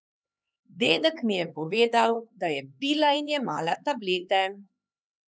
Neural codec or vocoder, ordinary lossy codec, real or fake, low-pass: codec, 16 kHz, 4 kbps, X-Codec, HuBERT features, trained on LibriSpeech; none; fake; none